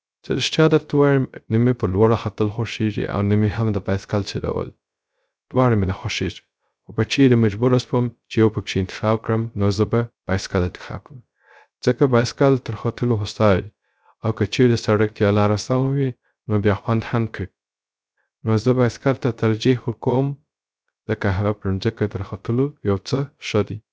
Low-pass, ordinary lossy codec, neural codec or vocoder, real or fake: none; none; codec, 16 kHz, 0.3 kbps, FocalCodec; fake